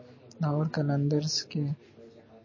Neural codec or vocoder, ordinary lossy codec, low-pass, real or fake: none; MP3, 32 kbps; 7.2 kHz; real